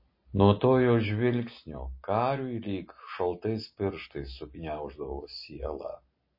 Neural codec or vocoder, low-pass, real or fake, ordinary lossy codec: none; 5.4 kHz; real; MP3, 24 kbps